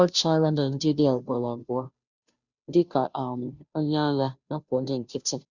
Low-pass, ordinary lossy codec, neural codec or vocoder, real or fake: 7.2 kHz; none; codec, 16 kHz, 0.5 kbps, FunCodec, trained on Chinese and English, 25 frames a second; fake